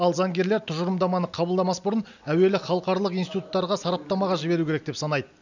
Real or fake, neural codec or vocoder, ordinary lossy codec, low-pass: real; none; none; 7.2 kHz